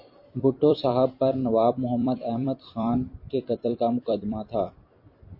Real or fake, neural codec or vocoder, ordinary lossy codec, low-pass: fake; vocoder, 44.1 kHz, 128 mel bands every 256 samples, BigVGAN v2; MP3, 32 kbps; 5.4 kHz